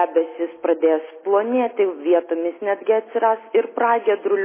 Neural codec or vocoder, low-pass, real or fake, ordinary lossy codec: none; 3.6 kHz; real; MP3, 16 kbps